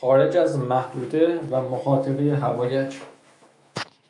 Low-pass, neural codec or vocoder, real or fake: 10.8 kHz; autoencoder, 48 kHz, 128 numbers a frame, DAC-VAE, trained on Japanese speech; fake